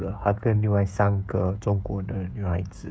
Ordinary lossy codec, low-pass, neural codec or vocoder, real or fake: none; none; codec, 16 kHz, 16 kbps, FreqCodec, smaller model; fake